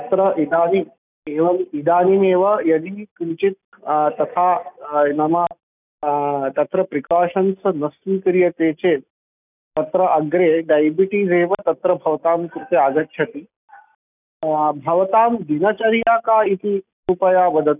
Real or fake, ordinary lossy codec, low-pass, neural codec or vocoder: real; none; 3.6 kHz; none